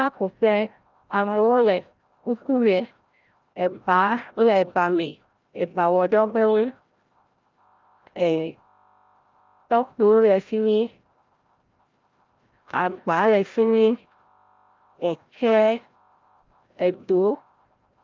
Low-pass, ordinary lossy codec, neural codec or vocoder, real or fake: 7.2 kHz; Opus, 24 kbps; codec, 16 kHz, 0.5 kbps, FreqCodec, larger model; fake